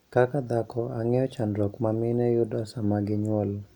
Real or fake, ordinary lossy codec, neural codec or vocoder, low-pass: real; none; none; 19.8 kHz